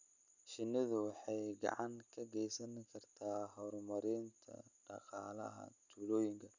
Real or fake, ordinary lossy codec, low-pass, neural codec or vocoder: real; none; 7.2 kHz; none